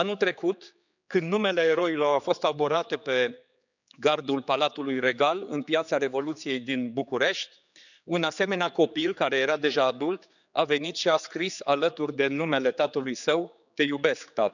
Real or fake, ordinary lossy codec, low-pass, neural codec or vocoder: fake; none; 7.2 kHz; codec, 16 kHz, 4 kbps, X-Codec, HuBERT features, trained on general audio